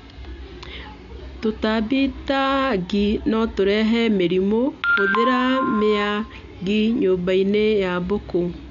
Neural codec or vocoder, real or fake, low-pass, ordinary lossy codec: none; real; 7.2 kHz; none